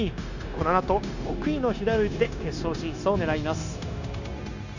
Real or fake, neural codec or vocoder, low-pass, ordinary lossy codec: fake; codec, 16 kHz, 0.9 kbps, LongCat-Audio-Codec; 7.2 kHz; none